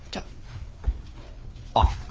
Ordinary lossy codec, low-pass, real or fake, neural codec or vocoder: none; none; fake; codec, 16 kHz, 4 kbps, FreqCodec, larger model